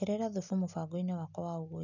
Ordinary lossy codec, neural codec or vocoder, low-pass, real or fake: none; none; 7.2 kHz; real